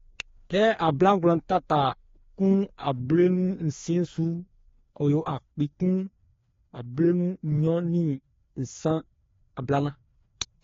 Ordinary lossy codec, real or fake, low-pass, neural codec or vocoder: AAC, 32 kbps; fake; 7.2 kHz; codec, 16 kHz, 2 kbps, FreqCodec, larger model